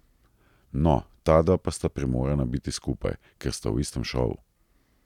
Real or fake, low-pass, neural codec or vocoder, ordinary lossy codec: real; 19.8 kHz; none; none